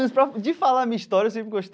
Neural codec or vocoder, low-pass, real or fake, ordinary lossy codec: none; none; real; none